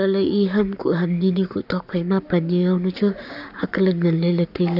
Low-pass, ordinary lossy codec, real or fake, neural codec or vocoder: 5.4 kHz; none; fake; codec, 44.1 kHz, 7.8 kbps, Pupu-Codec